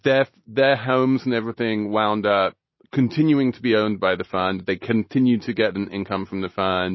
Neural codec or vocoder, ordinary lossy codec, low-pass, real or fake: none; MP3, 24 kbps; 7.2 kHz; real